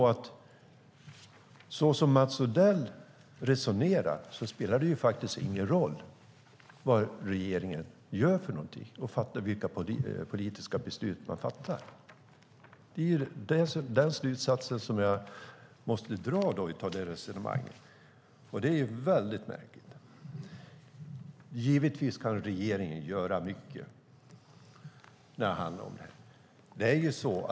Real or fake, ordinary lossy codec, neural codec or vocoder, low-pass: real; none; none; none